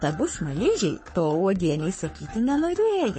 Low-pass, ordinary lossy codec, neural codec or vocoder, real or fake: 9.9 kHz; MP3, 32 kbps; codec, 44.1 kHz, 3.4 kbps, Pupu-Codec; fake